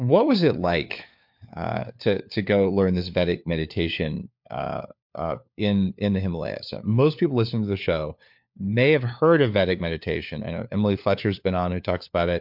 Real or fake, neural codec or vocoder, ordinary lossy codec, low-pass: fake; codec, 16 kHz, 4 kbps, FunCodec, trained on LibriTTS, 50 frames a second; MP3, 48 kbps; 5.4 kHz